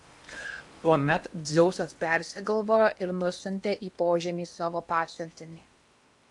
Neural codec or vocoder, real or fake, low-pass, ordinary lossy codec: codec, 16 kHz in and 24 kHz out, 0.8 kbps, FocalCodec, streaming, 65536 codes; fake; 10.8 kHz; AAC, 64 kbps